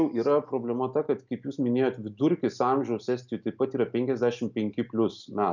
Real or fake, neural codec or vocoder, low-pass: real; none; 7.2 kHz